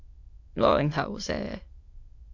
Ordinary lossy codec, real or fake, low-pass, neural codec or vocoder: Opus, 64 kbps; fake; 7.2 kHz; autoencoder, 22.05 kHz, a latent of 192 numbers a frame, VITS, trained on many speakers